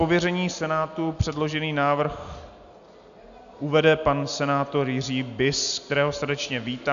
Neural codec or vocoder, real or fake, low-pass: none; real; 7.2 kHz